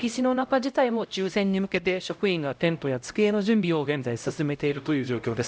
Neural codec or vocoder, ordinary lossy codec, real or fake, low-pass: codec, 16 kHz, 0.5 kbps, X-Codec, HuBERT features, trained on LibriSpeech; none; fake; none